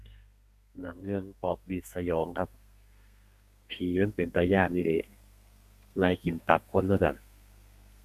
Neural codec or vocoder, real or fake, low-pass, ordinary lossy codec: codec, 32 kHz, 1.9 kbps, SNAC; fake; 14.4 kHz; none